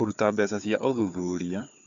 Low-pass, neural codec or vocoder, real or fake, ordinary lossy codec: 7.2 kHz; codec, 16 kHz, 4 kbps, FunCodec, trained on Chinese and English, 50 frames a second; fake; none